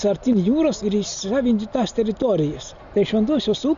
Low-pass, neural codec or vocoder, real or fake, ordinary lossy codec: 7.2 kHz; none; real; Opus, 64 kbps